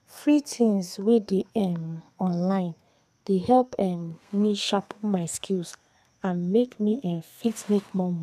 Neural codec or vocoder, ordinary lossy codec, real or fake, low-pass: codec, 32 kHz, 1.9 kbps, SNAC; none; fake; 14.4 kHz